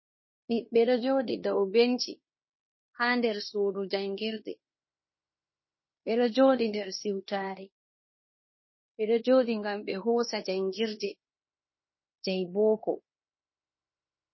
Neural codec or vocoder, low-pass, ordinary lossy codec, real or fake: codec, 16 kHz in and 24 kHz out, 0.9 kbps, LongCat-Audio-Codec, fine tuned four codebook decoder; 7.2 kHz; MP3, 24 kbps; fake